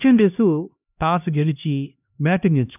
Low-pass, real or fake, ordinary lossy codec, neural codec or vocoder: 3.6 kHz; fake; none; codec, 16 kHz, 1 kbps, X-Codec, HuBERT features, trained on LibriSpeech